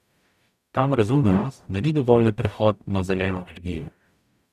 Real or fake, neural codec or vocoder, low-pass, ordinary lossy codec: fake; codec, 44.1 kHz, 0.9 kbps, DAC; 14.4 kHz; none